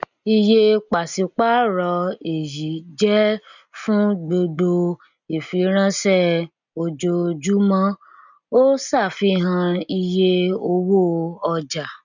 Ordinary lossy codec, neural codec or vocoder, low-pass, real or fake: none; none; 7.2 kHz; real